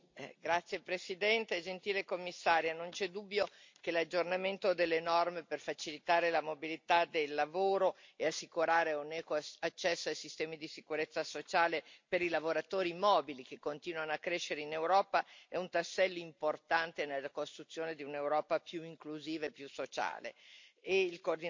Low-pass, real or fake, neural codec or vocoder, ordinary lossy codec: 7.2 kHz; real; none; MP3, 48 kbps